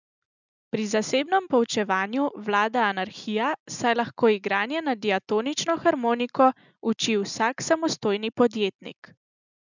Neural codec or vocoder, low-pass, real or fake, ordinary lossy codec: none; 7.2 kHz; real; none